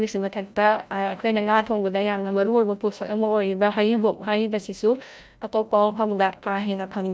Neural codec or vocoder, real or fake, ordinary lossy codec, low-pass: codec, 16 kHz, 0.5 kbps, FreqCodec, larger model; fake; none; none